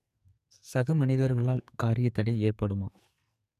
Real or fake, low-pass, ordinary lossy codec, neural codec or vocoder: fake; 14.4 kHz; none; codec, 44.1 kHz, 2.6 kbps, SNAC